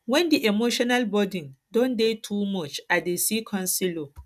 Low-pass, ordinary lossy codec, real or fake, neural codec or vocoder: 14.4 kHz; none; real; none